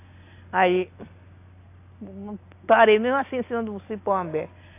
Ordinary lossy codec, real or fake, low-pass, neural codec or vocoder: AAC, 24 kbps; real; 3.6 kHz; none